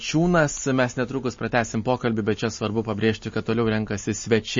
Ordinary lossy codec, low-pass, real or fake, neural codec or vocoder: MP3, 32 kbps; 7.2 kHz; real; none